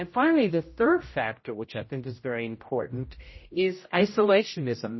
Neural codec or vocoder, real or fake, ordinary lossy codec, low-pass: codec, 16 kHz, 0.5 kbps, X-Codec, HuBERT features, trained on general audio; fake; MP3, 24 kbps; 7.2 kHz